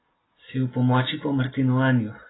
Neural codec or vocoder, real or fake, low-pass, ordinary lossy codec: none; real; 7.2 kHz; AAC, 16 kbps